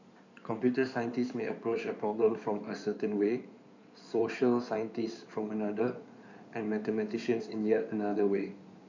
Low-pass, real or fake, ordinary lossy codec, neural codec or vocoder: 7.2 kHz; fake; none; codec, 16 kHz in and 24 kHz out, 2.2 kbps, FireRedTTS-2 codec